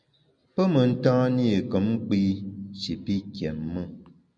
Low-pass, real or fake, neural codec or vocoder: 9.9 kHz; real; none